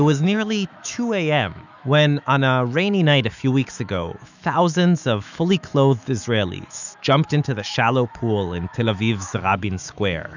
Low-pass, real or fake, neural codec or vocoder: 7.2 kHz; fake; autoencoder, 48 kHz, 128 numbers a frame, DAC-VAE, trained on Japanese speech